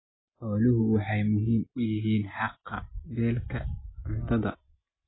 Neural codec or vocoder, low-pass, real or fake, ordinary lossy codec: none; 7.2 kHz; real; AAC, 16 kbps